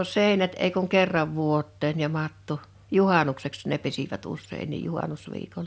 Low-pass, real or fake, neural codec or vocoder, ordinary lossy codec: none; real; none; none